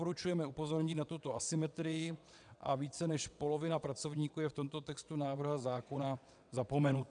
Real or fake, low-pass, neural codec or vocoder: fake; 9.9 kHz; vocoder, 22.05 kHz, 80 mel bands, WaveNeXt